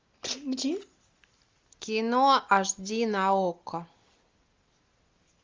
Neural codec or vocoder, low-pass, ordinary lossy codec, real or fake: none; 7.2 kHz; Opus, 32 kbps; real